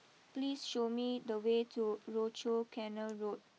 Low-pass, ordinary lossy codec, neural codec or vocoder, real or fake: none; none; none; real